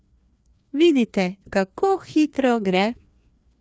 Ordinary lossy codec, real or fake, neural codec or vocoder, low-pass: none; fake; codec, 16 kHz, 2 kbps, FreqCodec, larger model; none